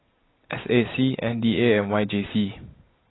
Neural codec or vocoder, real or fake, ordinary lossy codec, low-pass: none; real; AAC, 16 kbps; 7.2 kHz